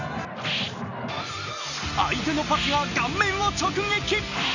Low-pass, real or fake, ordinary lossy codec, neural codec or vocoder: 7.2 kHz; real; none; none